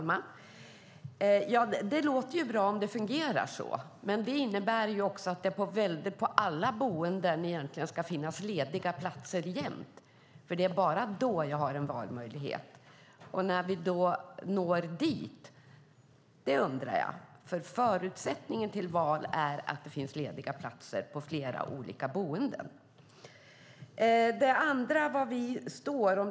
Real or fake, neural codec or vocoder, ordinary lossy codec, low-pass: real; none; none; none